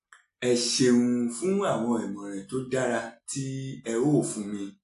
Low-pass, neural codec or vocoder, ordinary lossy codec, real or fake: 10.8 kHz; none; AAC, 64 kbps; real